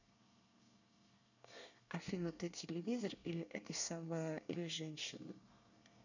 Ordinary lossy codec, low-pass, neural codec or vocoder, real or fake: none; 7.2 kHz; codec, 24 kHz, 1 kbps, SNAC; fake